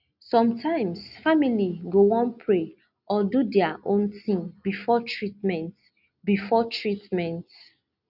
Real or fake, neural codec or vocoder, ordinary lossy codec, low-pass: real; none; none; 5.4 kHz